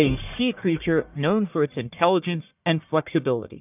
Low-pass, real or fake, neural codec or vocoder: 3.6 kHz; fake; codec, 44.1 kHz, 1.7 kbps, Pupu-Codec